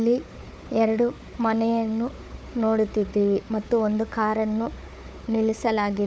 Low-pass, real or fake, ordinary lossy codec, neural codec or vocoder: none; fake; none; codec, 16 kHz, 4 kbps, FunCodec, trained on Chinese and English, 50 frames a second